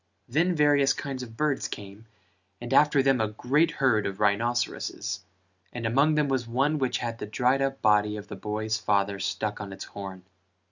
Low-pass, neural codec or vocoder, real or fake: 7.2 kHz; none; real